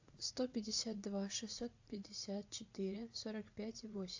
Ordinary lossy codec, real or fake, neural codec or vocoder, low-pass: AAC, 48 kbps; fake; vocoder, 44.1 kHz, 80 mel bands, Vocos; 7.2 kHz